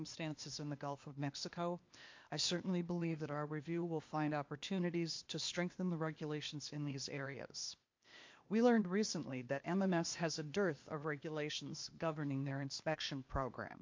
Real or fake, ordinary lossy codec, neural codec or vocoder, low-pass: fake; MP3, 48 kbps; codec, 16 kHz, 0.8 kbps, ZipCodec; 7.2 kHz